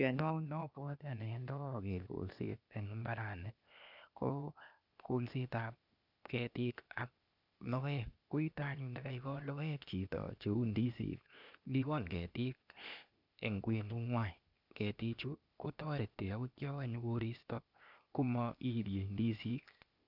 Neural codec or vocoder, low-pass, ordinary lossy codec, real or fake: codec, 16 kHz, 0.8 kbps, ZipCodec; 5.4 kHz; none; fake